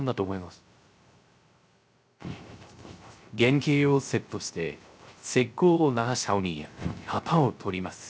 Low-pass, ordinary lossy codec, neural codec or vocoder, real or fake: none; none; codec, 16 kHz, 0.3 kbps, FocalCodec; fake